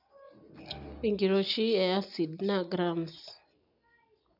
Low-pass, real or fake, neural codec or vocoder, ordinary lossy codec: 5.4 kHz; real; none; none